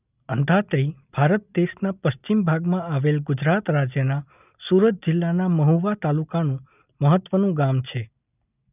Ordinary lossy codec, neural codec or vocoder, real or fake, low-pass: none; none; real; 3.6 kHz